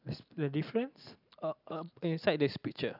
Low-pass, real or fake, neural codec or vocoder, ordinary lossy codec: 5.4 kHz; real; none; none